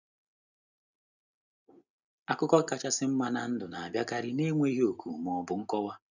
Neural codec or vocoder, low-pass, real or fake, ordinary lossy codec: none; 7.2 kHz; real; none